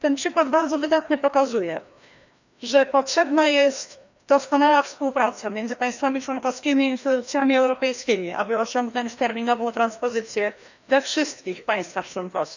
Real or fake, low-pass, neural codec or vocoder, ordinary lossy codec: fake; 7.2 kHz; codec, 16 kHz, 1 kbps, FreqCodec, larger model; none